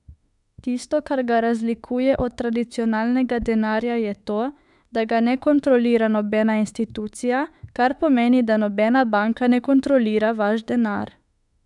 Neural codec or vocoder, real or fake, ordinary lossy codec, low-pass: autoencoder, 48 kHz, 32 numbers a frame, DAC-VAE, trained on Japanese speech; fake; none; 10.8 kHz